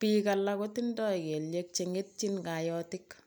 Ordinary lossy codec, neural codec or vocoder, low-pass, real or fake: none; none; none; real